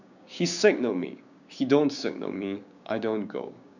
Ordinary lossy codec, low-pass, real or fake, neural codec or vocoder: none; 7.2 kHz; fake; codec, 16 kHz, 6 kbps, DAC